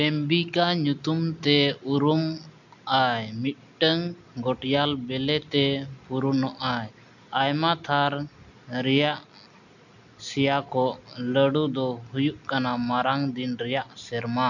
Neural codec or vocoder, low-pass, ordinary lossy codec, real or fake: none; 7.2 kHz; none; real